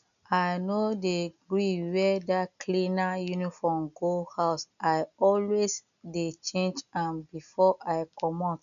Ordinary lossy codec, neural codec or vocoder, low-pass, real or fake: none; none; 7.2 kHz; real